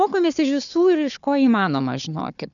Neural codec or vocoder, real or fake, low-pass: codec, 16 kHz, 4 kbps, FunCodec, trained on Chinese and English, 50 frames a second; fake; 7.2 kHz